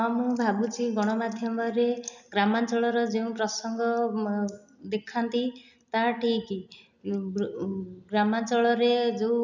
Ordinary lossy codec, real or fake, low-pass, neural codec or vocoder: none; real; 7.2 kHz; none